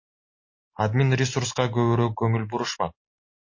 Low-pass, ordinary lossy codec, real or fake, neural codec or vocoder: 7.2 kHz; MP3, 32 kbps; real; none